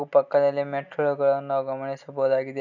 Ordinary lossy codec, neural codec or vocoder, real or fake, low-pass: none; none; real; 7.2 kHz